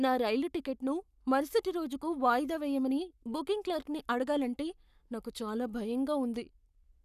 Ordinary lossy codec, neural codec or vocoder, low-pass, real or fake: none; codec, 44.1 kHz, 7.8 kbps, Pupu-Codec; 14.4 kHz; fake